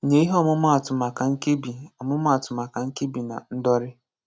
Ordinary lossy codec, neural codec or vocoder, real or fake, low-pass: none; none; real; none